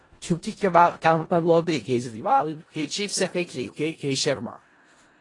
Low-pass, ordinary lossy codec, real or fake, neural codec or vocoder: 10.8 kHz; AAC, 32 kbps; fake; codec, 16 kHz in and 24 kHz out, 0.4 kbps, LongCat-Audio-Codec, four codebook decoder